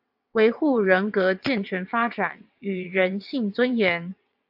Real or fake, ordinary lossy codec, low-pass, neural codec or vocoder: fake; AAC, 48 kbps; 5.4 kHz; vocoder, 22.05 kHz, 80 mel bands, WaveNeXt